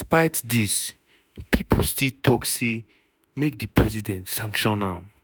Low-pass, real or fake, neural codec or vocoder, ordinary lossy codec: none; fake; autoencoder, 48 kHz, 32 numbers a frame, DAC-VAE, trained on Japanese speech; none